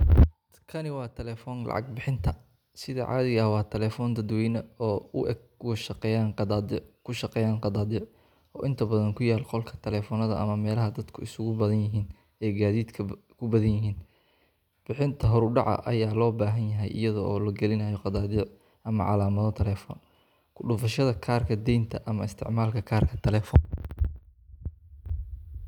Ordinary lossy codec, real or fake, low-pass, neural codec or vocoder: none; real; 19.8 kHz; none